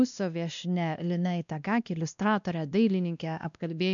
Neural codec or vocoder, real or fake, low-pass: codec, 16 kHz, 1 kbps, X-Codec, WavLM features, trained on Multilingual LibriSpeech; fake; 7.2 kHz